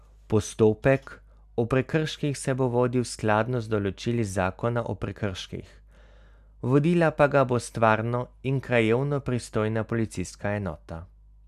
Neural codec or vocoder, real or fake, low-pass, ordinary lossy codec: none; real; 14.4 kHz; none